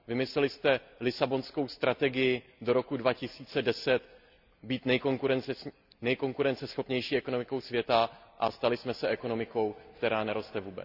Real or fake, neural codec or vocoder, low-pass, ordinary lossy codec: real; none; 5.4 kHz; none